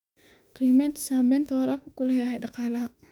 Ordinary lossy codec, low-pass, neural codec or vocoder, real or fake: none; 19.8 kHz; autoencoder, 48 kHz, 32 numbers a frame, DAC-VAE, trained on Japanese speech; fake